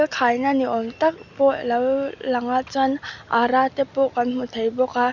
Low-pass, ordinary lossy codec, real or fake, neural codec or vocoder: 7.2 kHz; none; real; none